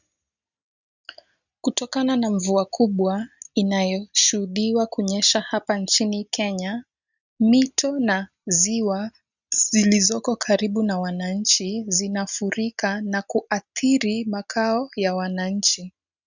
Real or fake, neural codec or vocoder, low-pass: real; none; 7.2 kHz